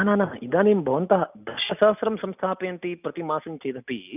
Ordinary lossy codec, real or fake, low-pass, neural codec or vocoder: none; real; 3.6 kHz; none